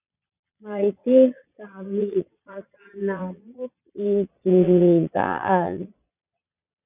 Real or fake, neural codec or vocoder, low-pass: fake; vocoder, 22.05 kHz, 80 mel bands, Vocos; 3.6 kHz